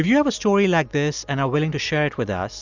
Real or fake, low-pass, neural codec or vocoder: real; 7.2 kHz; none